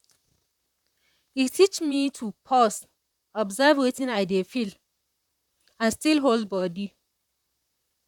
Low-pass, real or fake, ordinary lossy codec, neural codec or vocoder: 19.8 kHz; fake; none; vocoder, 44.1 kHz, 128 mel bands, Pupu-Vocoder